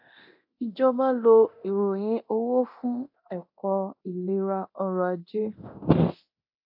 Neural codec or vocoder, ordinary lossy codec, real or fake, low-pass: codec, 24 kHz, 0.9 kbps, DualCodec; AAC, 48 kbps; fake; 5.4 kHz